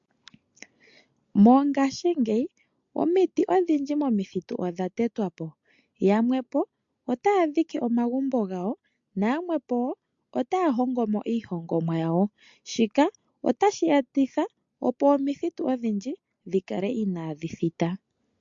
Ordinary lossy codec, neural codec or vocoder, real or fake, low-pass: MP3, 48 kbps; none; real; 7.2 kHz